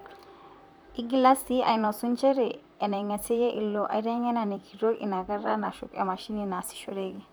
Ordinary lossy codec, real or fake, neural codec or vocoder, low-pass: none; fake; vocoder, 44.1 kHz, 128 mel bands, Pupu-Vocoder; none